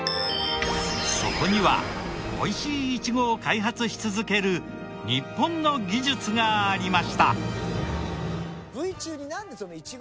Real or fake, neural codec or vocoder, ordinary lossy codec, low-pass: real; none; none; none